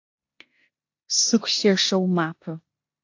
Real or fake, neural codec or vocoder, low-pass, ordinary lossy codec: fake; codec, 16 kHz in and 24 kHz out, 0.9 kbps, LongCat-Audio-Codec, four codebook decoder; 7.2 kHz; AAC, 48 kbps